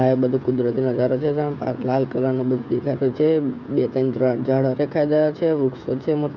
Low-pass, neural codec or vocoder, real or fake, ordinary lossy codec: 7.2 kHz; codec, 16 kHz, 16 kbps, FreqCodec, smaller model; fake; Opus, 64 kbps